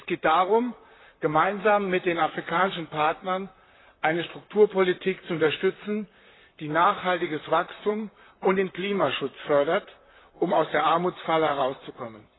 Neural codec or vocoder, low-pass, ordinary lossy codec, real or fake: vocoder, 44.1 kHz, 128 mel bands, Pupu-Vocoder; 7.2 kHz; AAC, 16 kbps; fake